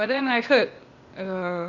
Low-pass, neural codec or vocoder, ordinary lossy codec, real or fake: 7.2 kHz; codec, 16 kHz, 0.8 kbps, ZipCodec; Opus, 64 kbps; fake